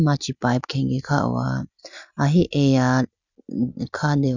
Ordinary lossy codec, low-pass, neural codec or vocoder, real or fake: none; 7.2 kHz; none; real